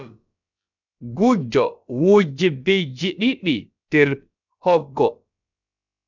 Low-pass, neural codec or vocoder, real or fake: 7.2 kHz; codec, 16 kHz, about 1 kbps, DyCAST, with the encoder's durations; fake